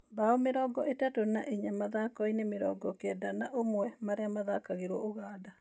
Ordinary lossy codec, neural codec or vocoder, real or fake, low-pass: none; none; real; none